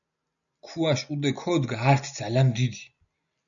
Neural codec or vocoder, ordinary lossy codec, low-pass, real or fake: none; MP3, 96 kbps; 7.2 kHz; real